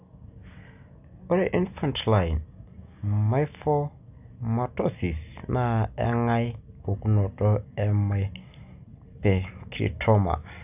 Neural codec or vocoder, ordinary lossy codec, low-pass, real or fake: none; none; 3.6 kHz; real